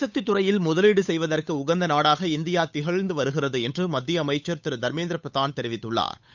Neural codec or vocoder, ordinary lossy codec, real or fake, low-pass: codec, 16 kHz, 8 kbps, FunCodec, trained on Chinese and English, 25 frames a second; none; fake; 7.2 kHz